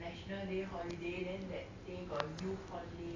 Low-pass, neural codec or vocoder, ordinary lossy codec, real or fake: 7.2 kHz; none; AAC, 32 kbps; real